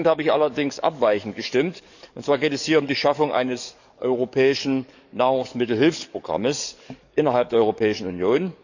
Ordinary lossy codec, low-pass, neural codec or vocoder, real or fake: none; 7.2 kHz; codec, 44.1 kHz, 7.8 kbps, DAC; fake